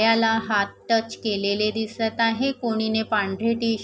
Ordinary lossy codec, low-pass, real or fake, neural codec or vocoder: none; none; real; none